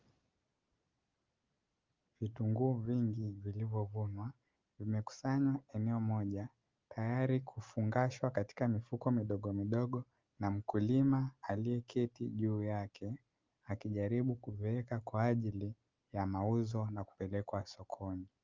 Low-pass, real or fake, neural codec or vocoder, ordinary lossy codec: 7.2 kHz; real; none; Opus, 32 kbps